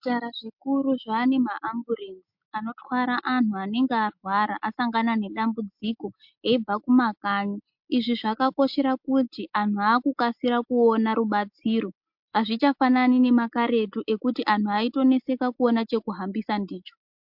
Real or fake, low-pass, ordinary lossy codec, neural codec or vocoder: real; 5.4 kHz; MP3, 48 kbps; none